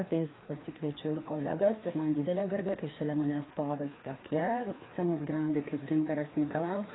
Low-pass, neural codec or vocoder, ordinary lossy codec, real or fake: 7.2 kHz; codec, 16 kHz, 2 kbps, FreqCodec, larger model; AAC, 16 kbps; fake